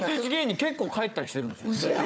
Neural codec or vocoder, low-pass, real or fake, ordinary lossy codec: codec, 16 kHz, 4 kbps, FunCodec, trained on Chinese and English, 50 frames a second; none; fake; none